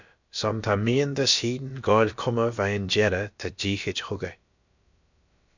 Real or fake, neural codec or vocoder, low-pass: fake; codec, 16 kHz, about 1 kbps, DyCAST, with the encoder's durations; 7.2 kHz